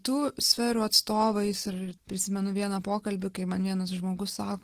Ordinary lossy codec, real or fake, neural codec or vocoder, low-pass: Opus, 16 kbps; real; none; 14.4 kHz